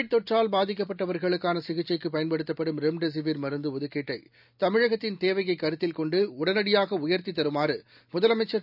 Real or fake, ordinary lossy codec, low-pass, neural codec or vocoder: real; none; 5.4 kHz; none